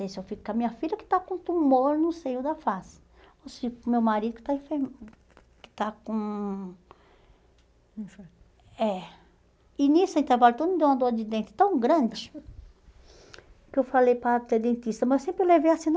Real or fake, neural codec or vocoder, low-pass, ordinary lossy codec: real; none; none; none